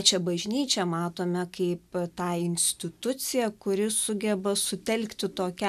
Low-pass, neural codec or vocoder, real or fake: 14.4 kHz; none; real